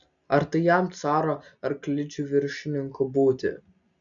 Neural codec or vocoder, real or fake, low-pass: none; real; 7.2 kHz